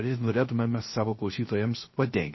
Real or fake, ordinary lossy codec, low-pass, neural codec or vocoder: fake; MP3, 24 kbps; 7.2 kHz; codec, 16 kHz, 0.3 kbps, FocalCodec